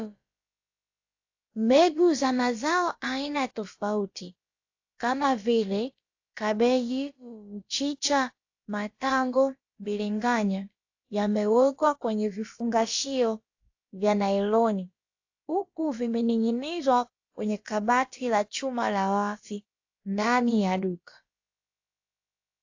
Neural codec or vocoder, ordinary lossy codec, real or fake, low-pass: codec, 16 kHz, about 1 kbps, DyCAST, with the encoder's durations; AAC, 48 kbps; fake; 7.2 kHz